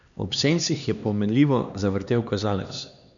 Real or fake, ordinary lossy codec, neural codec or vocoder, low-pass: fake; none; codec, 16 kHz, 2 kbps, X-Codec, HuBERT features, trained on LibriSpeech; 7.2 kHz